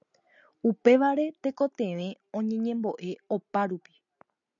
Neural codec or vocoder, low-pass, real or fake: none; 7.2 kHz; real